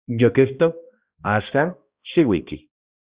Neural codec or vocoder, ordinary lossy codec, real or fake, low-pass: codec, 16 kHz, 1 kbps, X-Codec, HuBERT features, trained on balanced general audio; Opus, 64 kbps; fake; 3.6 kHz